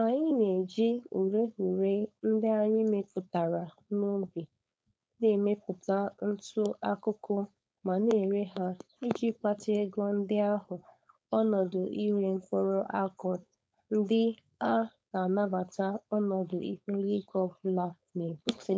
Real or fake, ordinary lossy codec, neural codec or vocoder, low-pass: fake; none; codec, 16 kHz, 4.8 kbps, FACodec; none